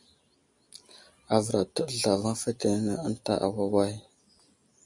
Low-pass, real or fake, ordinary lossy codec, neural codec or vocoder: 10.8 kHz; real; MP3, 64 kbps; none